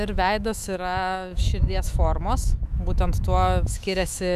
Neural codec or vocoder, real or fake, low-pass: autoencoder, 48 kHz, 128 numbers a frame, DAC-VAE, trained on Japanese speech; fake; 14.4 kHz